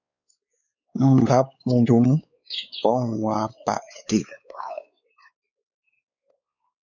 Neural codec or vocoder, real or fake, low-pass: codec, 16 kHz, 4 kbps, X-Codec, WavLM features, trained on Multilingual LibriSpeech; fake; 7.2 kHz